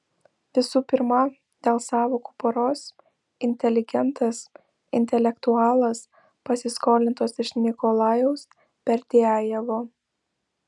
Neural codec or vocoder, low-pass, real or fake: none; 10.8 kHz; real